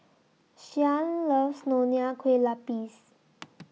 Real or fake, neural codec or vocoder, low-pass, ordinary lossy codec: real; none; none; none